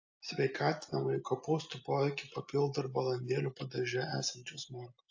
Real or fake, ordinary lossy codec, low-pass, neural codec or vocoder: real; AAC, 48 kbps; 7.2 kHz; none